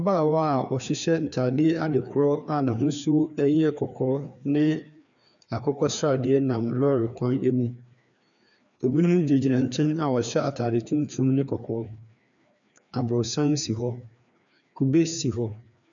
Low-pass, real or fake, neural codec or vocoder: 7.2 kHz; fake; codec, 16 kHz, 2 kbps, FreqCodec, larger model